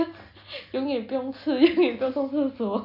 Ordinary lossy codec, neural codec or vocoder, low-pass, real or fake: none; none; 5.4 kHz; real